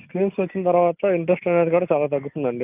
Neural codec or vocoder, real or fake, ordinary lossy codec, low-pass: none; real; AAC, 32 kbps; 3.6 kHz